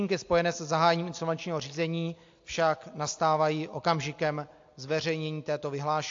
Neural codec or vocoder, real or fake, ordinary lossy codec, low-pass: none; real; AAC, 48 kbps; 7.2 kHz